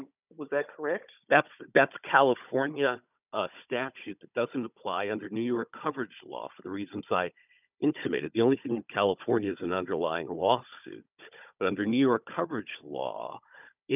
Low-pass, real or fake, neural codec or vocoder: 3.6 kHz; fake; codec, 16 kHz, 16 kbps, FunCodec, trained on Chinese and English, 50 frames a second